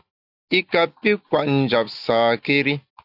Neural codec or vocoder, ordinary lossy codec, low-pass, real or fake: none; AAC, 48 kbps; 5.4 kHz; real